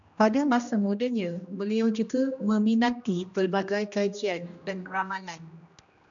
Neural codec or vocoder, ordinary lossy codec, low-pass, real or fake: codec, 16 kHz, 1 kbps, X-Codec, HuBERT features, trained on general audio; MP3, 96 kbps; 7.2 kHz; fake